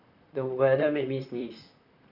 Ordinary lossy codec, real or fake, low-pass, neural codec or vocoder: none; fake; 5.4 kHz; vocoder, 44.1 kHz, 128 mel bands, Pupu-Vocoder